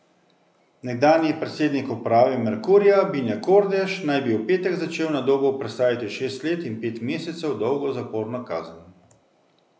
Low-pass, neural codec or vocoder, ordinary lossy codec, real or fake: none; none; none; real